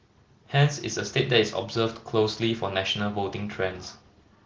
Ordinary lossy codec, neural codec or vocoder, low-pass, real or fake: Opus, 16 kbps; none; 7.2 kHz; real